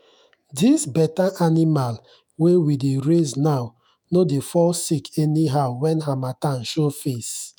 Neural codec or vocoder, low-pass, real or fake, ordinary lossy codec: autoencoder, 48 kHz, 128 numbers a frame, DAC-VAE, trained on Japanese speech; none; fake; none